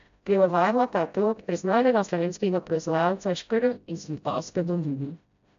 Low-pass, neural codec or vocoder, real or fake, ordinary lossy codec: 7.2 kHz; codec, 16 kHz, 0.5 kbps, FreqCodec, smaller model; fake; AAC, 64 kbps